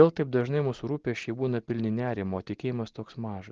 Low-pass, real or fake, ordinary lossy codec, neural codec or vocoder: 7.2 kHz; real; Opus, 16 kbps; none